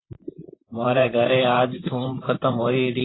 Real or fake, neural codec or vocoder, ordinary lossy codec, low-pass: fake; vocoder, 44.1 kHz, 128 mel bands, Pupu-Vocoder; AAC, 16 kbps; 7.2 kHz